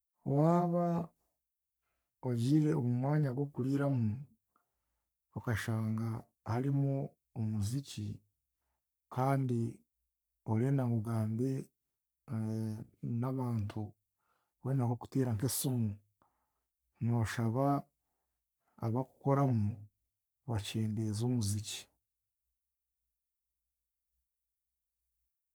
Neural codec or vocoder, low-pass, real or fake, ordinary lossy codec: codec, 44.1 kHz, 3.4 kbps, Pupu-Codec; none; fake; none